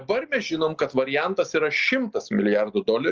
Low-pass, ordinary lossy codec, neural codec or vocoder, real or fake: 7.2 kHz; Opus, 24 kbps; none; real